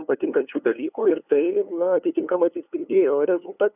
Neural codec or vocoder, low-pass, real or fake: codec, 16 kHz, 2 kbps, FunCodec, trained on LibriTTS, 25 frames a second; 3.6 kHz; fake